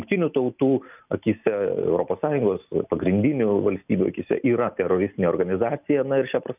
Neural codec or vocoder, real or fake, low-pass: none; real; 3.6 kHz